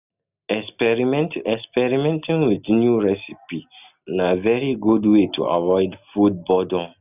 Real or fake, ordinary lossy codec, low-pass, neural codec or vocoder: real; none; 3.6 kHz; none